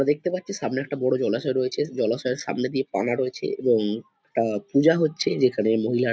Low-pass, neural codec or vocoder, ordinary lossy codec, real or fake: none; none; none; real